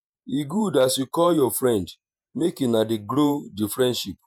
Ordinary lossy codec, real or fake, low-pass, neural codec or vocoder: none; fake; none; vocoder, 48 kHz, 128 mel bands, Vocos